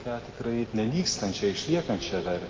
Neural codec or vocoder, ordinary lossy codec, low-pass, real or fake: none; Opus, 24 kbps; 7.2 kHz; real